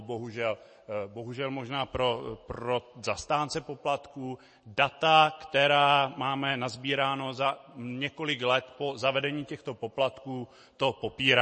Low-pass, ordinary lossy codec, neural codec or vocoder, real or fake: 9.9 kHz; MP3, 32 kbps; none; real